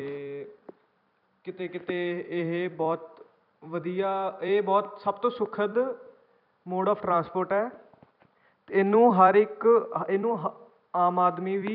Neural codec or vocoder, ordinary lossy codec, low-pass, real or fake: vocoder, 44.1 kHz, 128 mel bands every 256 samples, BigVGAN v2; none; 5.4 kHz; fake